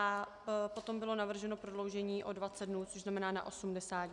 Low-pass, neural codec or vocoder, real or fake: 10.8 kHz; none; real